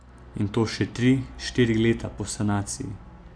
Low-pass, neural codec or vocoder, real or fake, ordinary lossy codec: 9.9 kHz; none; real; MP3, 96 kbps